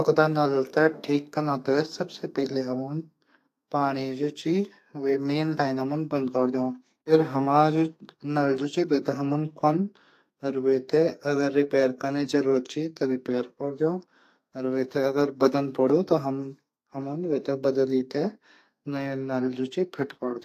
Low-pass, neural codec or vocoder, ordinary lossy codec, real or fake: 14.4 kHz; codec, 32 kHz, 1.9 kbps, SNAC; AAC, 64 kbps; fake